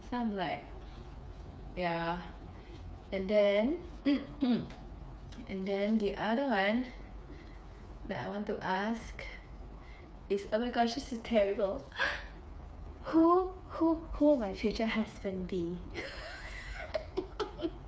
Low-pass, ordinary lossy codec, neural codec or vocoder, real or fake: none; none; codec, 16 kHz, 4 kbps, FreqCodec, smaller model; fake